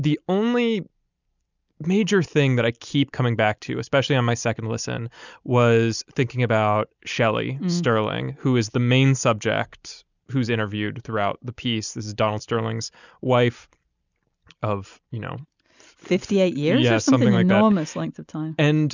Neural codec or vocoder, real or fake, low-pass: none; real; 7.2 kHz